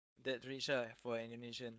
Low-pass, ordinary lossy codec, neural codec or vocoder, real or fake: none; none; codec, 16 kHz, 4.8 kbps, FACodec; fake